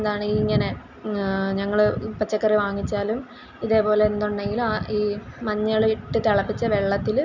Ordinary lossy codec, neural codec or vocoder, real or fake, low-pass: none; none; real; 7.2 kHz